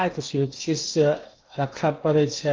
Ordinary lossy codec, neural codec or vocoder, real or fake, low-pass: Opus, 24 kbps; codec, 16 kHz in and 24 kHz out, 0.8 kbps, FocalCodec, streaming, 65536 codes; fake; 7.2 kHz